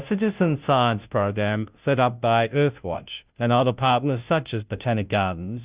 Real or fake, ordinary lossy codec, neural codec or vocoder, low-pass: fake; Opus, 64 kbps; codec, 16 kHz, 0.5 kbps, FunCodec, trained on Chinese and English, 25 frames a second; 3.6 kHz